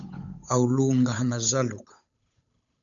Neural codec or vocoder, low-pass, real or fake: codec, 16 kHz, 8 kbps, FunCodec, trained on Chinese and English, 25 frames a second; 7.2 kHz; fake